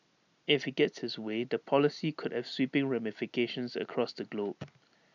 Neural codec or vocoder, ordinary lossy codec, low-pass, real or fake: none; none; 7.2 kHz; real